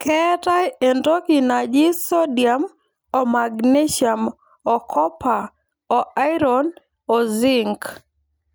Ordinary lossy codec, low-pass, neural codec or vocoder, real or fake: none; none; none; real